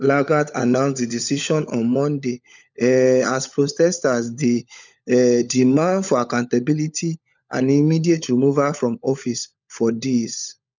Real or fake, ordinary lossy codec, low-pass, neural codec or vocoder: fake; none; 7.2 kHz; codec, 16 kHz, 16 kbps, FunCodec, trained on LibriTTS, 50 frames a second